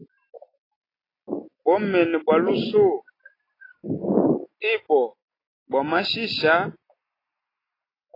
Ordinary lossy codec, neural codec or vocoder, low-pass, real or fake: AAC, 24 kbps; none; 5.4 kHz; real